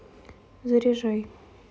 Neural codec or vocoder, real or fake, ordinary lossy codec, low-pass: none; real; none; none